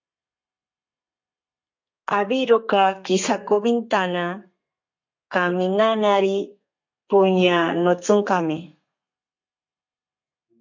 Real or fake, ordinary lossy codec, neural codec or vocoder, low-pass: fake; MP3, 48 kbps; codec, 32 kHz, 1.9 kbps, SNAC; 7.2 kHz